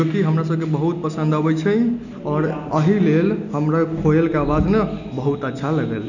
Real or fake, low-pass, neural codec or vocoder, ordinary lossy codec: real; 7.2 kHz; none; none